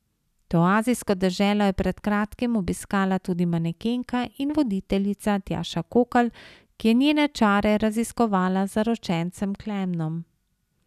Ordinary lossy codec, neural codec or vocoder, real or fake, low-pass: none; none; real; 14.4 kHz